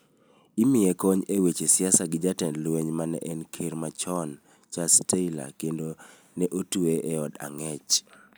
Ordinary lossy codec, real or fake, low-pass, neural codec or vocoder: none; real; none; none